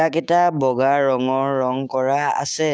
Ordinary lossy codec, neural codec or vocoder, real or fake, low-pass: none; codec, 16 kHz, 6 kbps, DAC; fake; none